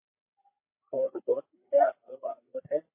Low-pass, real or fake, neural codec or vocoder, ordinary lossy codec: 3.6 kHz; fake; codec, 16 kHz, 4 kbps, FreqCodec, larger model; MP3, 24 kbps